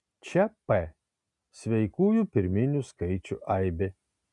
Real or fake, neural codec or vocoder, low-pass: real; none; 10.8 kHz